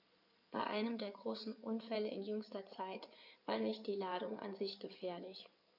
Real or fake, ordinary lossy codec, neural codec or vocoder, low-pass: fake; none; codec, 16 kHz in and 24 kHz out, 2.2 kbps, FireRedTTS-2 codec; 5.4 kHz